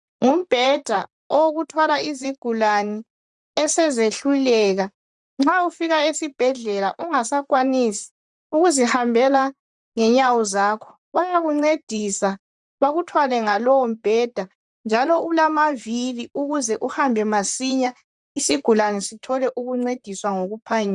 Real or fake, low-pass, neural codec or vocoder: fake; 10.8 kHz; vocoder, 44.1 kHz, 128 mel bands, Pupu-Vocoder